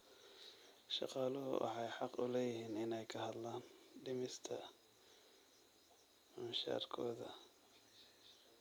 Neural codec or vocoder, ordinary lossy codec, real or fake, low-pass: none; none; real; none